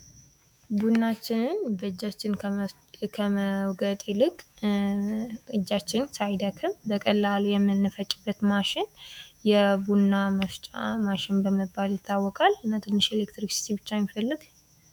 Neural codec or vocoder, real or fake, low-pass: autoencoder, 48 kHz, 128 numbers a frame, DAC-VAE, trained on Japanese speech; fake; 19.8 kHz